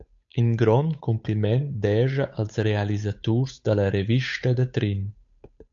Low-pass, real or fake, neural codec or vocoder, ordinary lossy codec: 7.2 kHz; fake; codec, 16 kHz, 8 kbps, FunCodec, trained on Chinese and English, 25 frames a second; AAC, 64 kbps